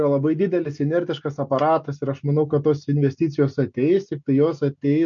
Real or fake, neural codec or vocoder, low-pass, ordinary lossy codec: real; none; 7.2 kHz; MP3, 48 kbps